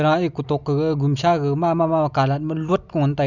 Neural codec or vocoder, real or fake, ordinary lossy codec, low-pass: none; real; none; 7.2 kHz